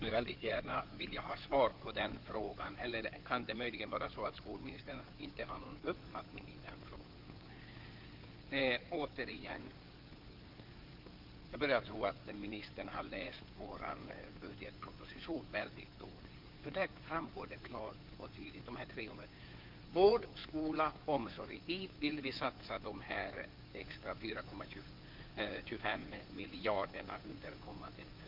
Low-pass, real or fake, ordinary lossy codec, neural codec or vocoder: 5.4 kHz; fake; Opus, 24 kbps; codec, 16 kHz in and 24 kHz out, 2.2 kbps, FireRedTTS-2 codec